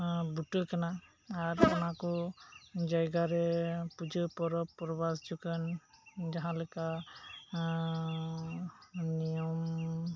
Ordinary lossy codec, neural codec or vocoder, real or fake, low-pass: none; none; real; none